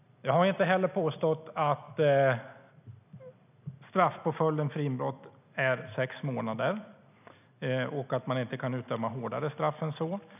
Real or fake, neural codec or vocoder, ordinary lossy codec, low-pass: real; none; none; 3.6 kHz